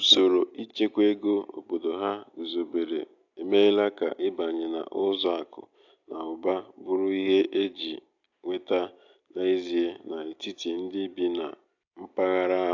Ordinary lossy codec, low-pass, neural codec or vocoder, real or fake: AAC, 48 kbps; 7.2 kHz; none; real